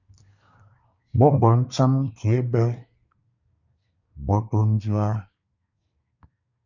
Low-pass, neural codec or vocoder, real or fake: 7.2 kHz; codec, 24 kHz, 1 kbps, SNAC; fake